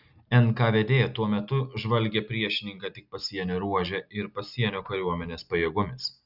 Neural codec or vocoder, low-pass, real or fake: none; 5.4 kHz; real